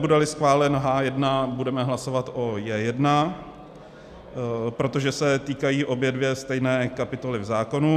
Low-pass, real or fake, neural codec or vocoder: 14.4 kHz; real; none